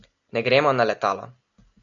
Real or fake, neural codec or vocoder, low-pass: real; none; 7.2 kHz